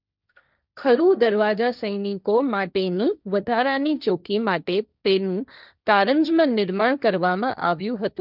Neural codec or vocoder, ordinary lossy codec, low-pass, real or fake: codec, 16 kHz, 1.1 kbps, Voila-Tokenizer; none; 5.4 kHz; fake